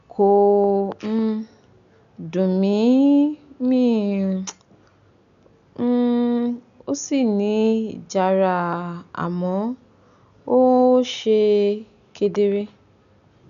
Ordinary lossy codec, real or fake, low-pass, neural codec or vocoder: none; real; 7.2 kHz; none